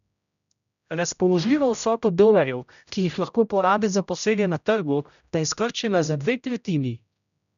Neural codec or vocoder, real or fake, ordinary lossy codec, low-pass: codec, 16 kHz, 0.5 kbps, X-Codec, HuBERT features, trained on general audio; fake; none; 7.2 kHz